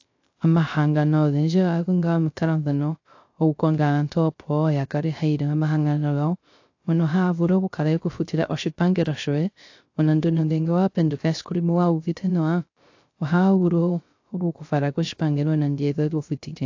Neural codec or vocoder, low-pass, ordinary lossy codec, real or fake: codec, 16 kHz, 0.3 kbps, FocalCodec; 7.2 kHz; AAC, 48 kbps; fake